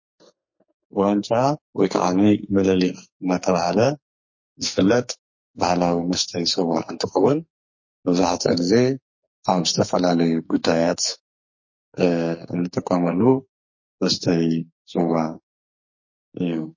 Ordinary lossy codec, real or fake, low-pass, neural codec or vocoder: MP3, 32 kbps; fake; 7.2 kHz; codec, 44.1 kHz, 2.6 kbps, SNAC